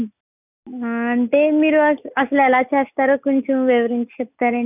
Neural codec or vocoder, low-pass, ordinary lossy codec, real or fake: none; 3.6 kHz; none; real